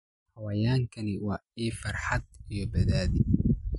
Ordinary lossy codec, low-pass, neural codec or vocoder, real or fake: MP3, 48 kbps; 19.8 kHz; none; real